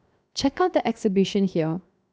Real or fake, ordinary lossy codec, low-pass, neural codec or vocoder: fake; none; none; codec, 16 kHz, 0.7 kbps, FocalCodec